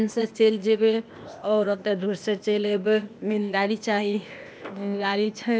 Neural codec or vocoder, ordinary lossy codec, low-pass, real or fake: codec, 16 kHz, 0.8 kbps, ZipCodec; none; none; fake